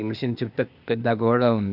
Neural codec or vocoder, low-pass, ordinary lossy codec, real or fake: codec, 16 kHz, 0.8 kbps, ZipCodec; 5.4 kHz; none; fake